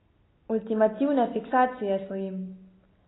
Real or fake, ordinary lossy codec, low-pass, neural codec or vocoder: real; AAC, 16 kbps; 7.2 kHz; none